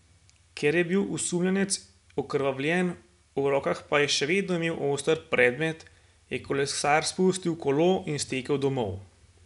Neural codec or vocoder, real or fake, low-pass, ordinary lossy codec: none; real; 10.8 kHz; none